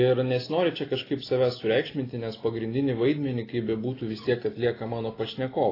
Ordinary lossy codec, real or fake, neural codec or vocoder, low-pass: AAC, 24 kbps; real; none; 5.4 kHz